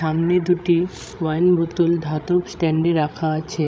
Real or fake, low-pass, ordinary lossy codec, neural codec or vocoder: fake; none; none; codec, 16 kHz, 16 kbps, FreqCodec, larger model